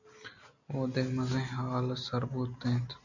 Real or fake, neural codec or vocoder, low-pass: real; none; 7.2 kHz